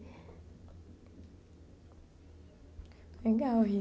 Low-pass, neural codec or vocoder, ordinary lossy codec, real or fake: none; none; none; real